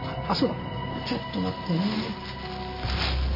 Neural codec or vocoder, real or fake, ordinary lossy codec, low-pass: none; real; MP3, 24 kbps; 5.4 kHz